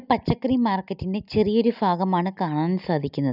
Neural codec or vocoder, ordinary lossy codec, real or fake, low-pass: none; none; real; 5.4 kHz